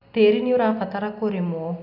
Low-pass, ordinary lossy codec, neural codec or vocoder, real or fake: 5.4 kHz; none; none; real